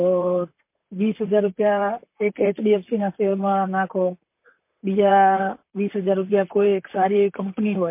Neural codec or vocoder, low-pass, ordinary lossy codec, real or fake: vocoder, 44.1 kHz, 128 mel bands, Pupu-Vocoder; 3.6 kHz; MP3, 24 kbps; fake